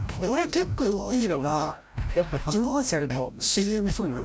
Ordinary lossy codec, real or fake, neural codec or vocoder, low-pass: none; fake; codec, 16 kHz, 0.5 kbps, FreqCodec, larger model; none